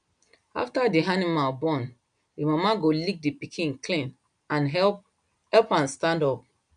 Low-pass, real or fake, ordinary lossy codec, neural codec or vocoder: 9.9 kHz; real; none; none